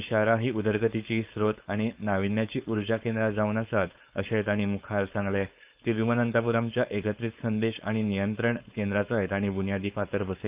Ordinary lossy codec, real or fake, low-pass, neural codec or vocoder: Opus, 24 kbps; fake; 3.6 kHz; codec, 16 kHz, 4.8 kbps, FACodec